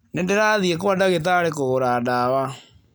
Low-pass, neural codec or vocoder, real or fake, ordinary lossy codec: none; none; real; none